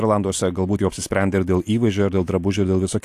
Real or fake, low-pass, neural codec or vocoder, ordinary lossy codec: real; 14.4 kHz; none; AAC, 64 kbps